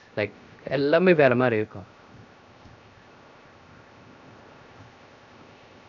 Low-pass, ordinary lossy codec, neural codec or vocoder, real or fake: 7.2 kHz; none; codec, 16 kHz, 0.7 kbps, FocalCodec; fake